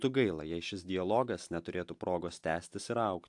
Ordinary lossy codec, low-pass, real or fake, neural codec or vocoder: AAC, 64 kbps; 10.8 kHz; real; none